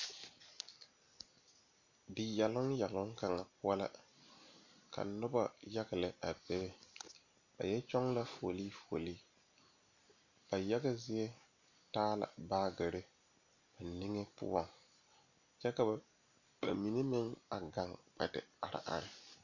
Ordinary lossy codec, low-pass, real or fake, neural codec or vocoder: MP3, 64 kbps; 7.2 kHz; real; none